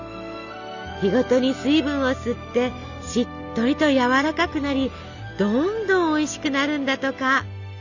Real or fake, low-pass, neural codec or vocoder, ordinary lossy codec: real; 7.2 kHz; none; none